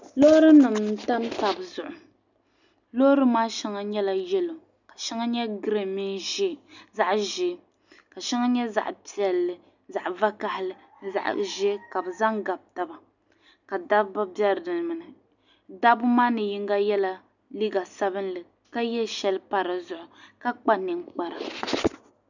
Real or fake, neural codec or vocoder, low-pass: real; none; 7.2 kHz